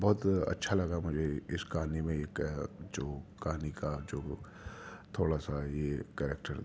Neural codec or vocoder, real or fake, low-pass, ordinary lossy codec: none; real; none; none